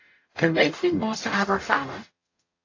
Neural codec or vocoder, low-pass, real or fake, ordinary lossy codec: codec, 44.1 kHz, 0.9 kbps, DAC; 7.2 kHz; fake; AAC, 32 kbps